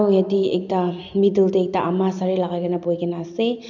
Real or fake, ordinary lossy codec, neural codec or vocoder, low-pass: real; none; none; 7.2 kHz